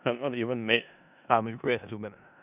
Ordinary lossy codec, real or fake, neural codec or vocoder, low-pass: none; fake; codec, 16 kHz in and 24 kHz out, 0.4 kbps, LongCat-Audio-Codec, four codebook decoder; 3.6 kHz